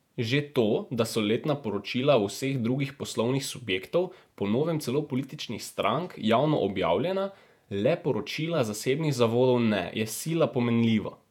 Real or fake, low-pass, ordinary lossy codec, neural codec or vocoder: fake; 19.8 kHz; none; vocoder, 48 kHz, 128 mel bands, Vocos